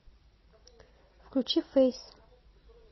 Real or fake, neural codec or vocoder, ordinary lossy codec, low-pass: real; none; MP3, 24 kbps; 7.2 kHz